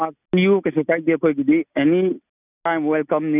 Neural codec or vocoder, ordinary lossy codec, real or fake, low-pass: none; none; real; 3.6 kHz